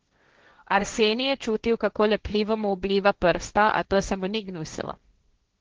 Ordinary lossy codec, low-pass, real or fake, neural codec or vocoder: Opus, 16 kbps; 7.2 kHz; fake; codec, 16 kHz, 1.1 kbps, Voila-Tokenizer